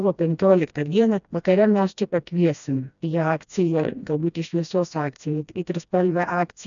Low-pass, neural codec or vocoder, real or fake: 7.2 kHz; codec, 16 kHz, 1 kbps, FreqCodec, smaller model; fake